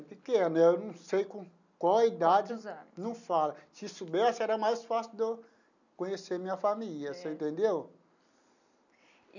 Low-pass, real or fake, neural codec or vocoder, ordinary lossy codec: 7.2 kHz; real; none; none